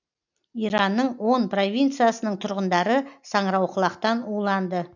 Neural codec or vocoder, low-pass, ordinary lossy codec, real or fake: none; 7.2 kHz; none; real